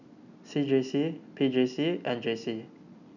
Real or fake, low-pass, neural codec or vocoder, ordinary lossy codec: real; 7.2 kHz; none; none